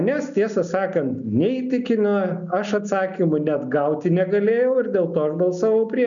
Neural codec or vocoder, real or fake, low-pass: none; real; 7.2 kHz